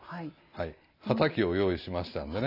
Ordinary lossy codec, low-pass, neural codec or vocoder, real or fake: none; 5.4 kHz; none; real